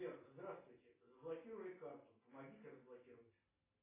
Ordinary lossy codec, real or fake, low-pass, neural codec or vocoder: AAC, 16 kbps; real; 3.6 kHz; none